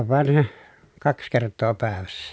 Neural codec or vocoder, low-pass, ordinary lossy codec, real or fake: none; none; none; real